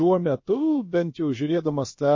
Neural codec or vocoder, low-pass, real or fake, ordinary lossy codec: codec, 16 kHz, about 1 kbps, DyCAST, with the encoder's durations; 7.2 kHz; fake; MP3, 32 kbps